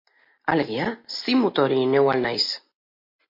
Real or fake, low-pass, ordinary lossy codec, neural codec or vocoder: real; 5.4 kHz; MP3, 32 kbps; none